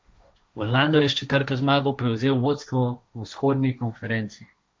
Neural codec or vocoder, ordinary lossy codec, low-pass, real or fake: codec, 16 kHz, 1.1 kbps, Voila-Tokenizer; none; none; fake